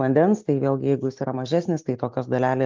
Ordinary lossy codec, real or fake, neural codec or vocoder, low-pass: Opus, 24 kbps; real; none; 7.2 kHz